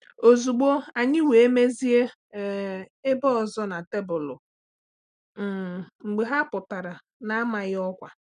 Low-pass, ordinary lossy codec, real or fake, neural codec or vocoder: 9.9 kHz; none; real; none